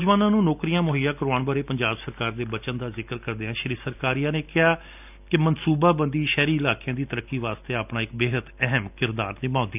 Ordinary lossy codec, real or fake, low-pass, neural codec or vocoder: none; real; 3.6 kHz; none